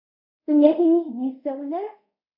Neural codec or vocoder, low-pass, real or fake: codec, 24 kHz, 0.5 kbps, DualCodec; 5.4 kHz; fake